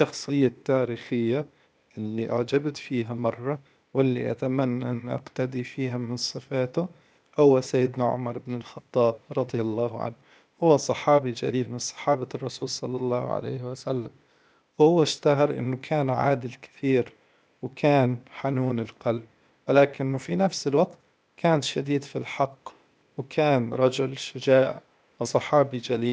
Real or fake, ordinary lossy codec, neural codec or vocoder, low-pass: fake; none; codec, 16 kHz, 0.8 kbps, ZipCodec; none